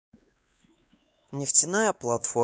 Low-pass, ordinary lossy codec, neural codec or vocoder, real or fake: none; none; codec, 16 kHz, 2 kbps, X-Codec, WavLM features, trained on Multilingual LibriSpeech; fake